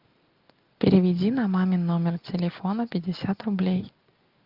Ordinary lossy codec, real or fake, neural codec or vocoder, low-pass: Opus, 24 kbps; real; none; 5.4 kHz